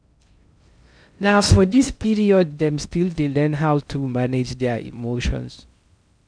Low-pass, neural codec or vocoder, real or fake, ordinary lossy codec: 9.9 kHz; codec, 16 kHz in and 24 kHz out, 0.6 kbps, FocalCodec, streaming, 2048 codes; fake; none